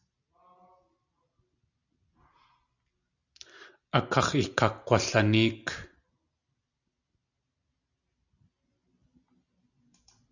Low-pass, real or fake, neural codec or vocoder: 7.2 kHz; real; none